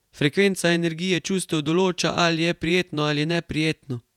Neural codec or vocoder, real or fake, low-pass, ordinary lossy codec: vocoder, 48 kHz, 128 mel bands, Vocos; fake; 19.8 kHz; none